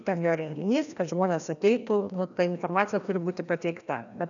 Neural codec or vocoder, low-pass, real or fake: codec, 16 kHz, 1 kbps, FreqCodec, larger model; 7.2 kHz; fake